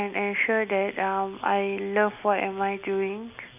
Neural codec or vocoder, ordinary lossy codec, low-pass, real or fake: none; none; 3.6 kHz; real